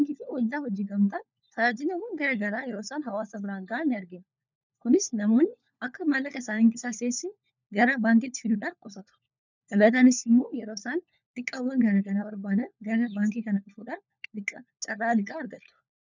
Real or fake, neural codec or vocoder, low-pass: fake; codec, 16 kHz, 4 kbps, FunCodec, trained on LibriTTS, 50 frames a second; 7.2 kHz